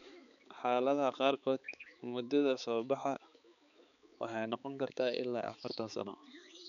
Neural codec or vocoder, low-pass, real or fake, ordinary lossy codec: codec, 16 kHz, 4 kbps, X-Codec, HuBERT features, trained on balanced general audio; 7.2 kHz; fake; none